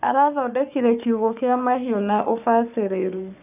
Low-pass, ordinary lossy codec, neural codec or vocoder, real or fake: 3.6 kHz; none; codec, 44.1 kHz, 3.4 kbps, Pupu-Codec; fake